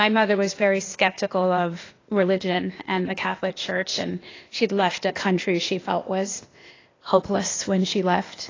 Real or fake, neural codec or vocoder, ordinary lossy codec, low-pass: fake; codec, 16 kHz, 0.8 kbps, ZipCodec; AAC, 32 kbps; 7.2 kHz